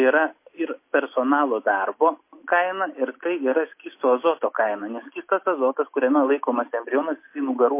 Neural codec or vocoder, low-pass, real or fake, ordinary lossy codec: none; 3.6 kHz; real; MP3, 24 kbps